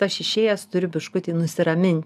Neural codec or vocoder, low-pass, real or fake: none; 14.4 kHz; real